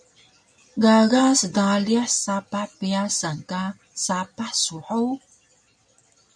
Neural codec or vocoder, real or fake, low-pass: none; real; 9.9 kHz